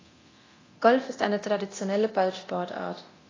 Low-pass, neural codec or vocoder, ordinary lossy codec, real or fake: 7.2 kHz; codec, 24 kHz, 0.9 kbps, DualCodec; AAC, 32 kbps; fake